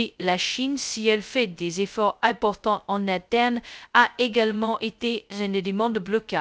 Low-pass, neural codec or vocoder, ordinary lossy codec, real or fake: none; codec, 16 kHz, 0.2 kbps, FocalCodec; none; fake